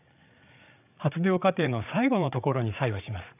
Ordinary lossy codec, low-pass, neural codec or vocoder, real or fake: none; 3.6 kHz; codec, 16 kHz, 8 kbps, FreqCodec, larger model; fake